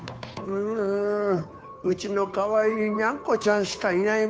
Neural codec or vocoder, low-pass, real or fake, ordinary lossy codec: codec, 16 kHz, 2 kbps, FunCodec, trained on Chinese and English, 25 frames a second; none; fake; none